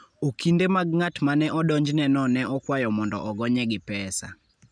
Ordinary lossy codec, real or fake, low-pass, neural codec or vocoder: none; real; 9.9 kHz; none